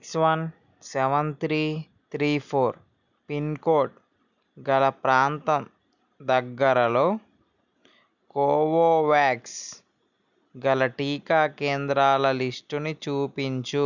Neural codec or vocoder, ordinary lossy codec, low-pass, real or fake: none; none; 7.2 kHz; real